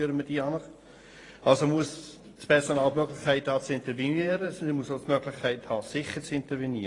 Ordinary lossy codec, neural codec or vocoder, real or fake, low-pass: AAC, 32 kbps; vocoder, 44.1 kHz, 128 mel bands every 512 samples, BigVGAN v2; fake; 10.8 kHz